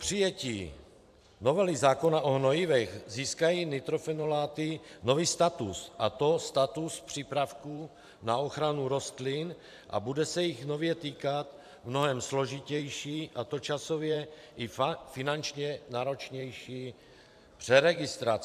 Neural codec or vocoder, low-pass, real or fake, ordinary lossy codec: vocoder, 44.1 kHz, 128 mel bands every 512 samples, BigVGAN v2; 14.4 kHz; fake; AAC, 96 kbps